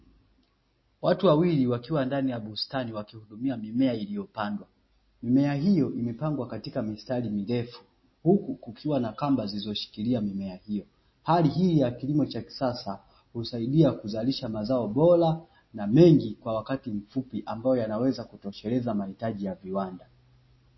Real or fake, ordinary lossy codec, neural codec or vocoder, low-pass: real; MP3, 24 kbps; none; 7.2 kHz